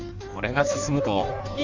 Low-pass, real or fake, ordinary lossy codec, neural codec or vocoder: 7.2 kHz; fake; none; codec, 16 kHz in and 24 kHz out, 1.1 kbps, FireRedTTS-2 codec